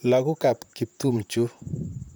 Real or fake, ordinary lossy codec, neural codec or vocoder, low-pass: fake; none; vocoder, 44.1 kHz, 128 mel bands, Pupu-Vocoder; none